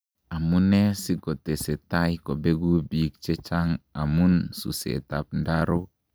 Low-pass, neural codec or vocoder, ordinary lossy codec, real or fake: none; none; none; real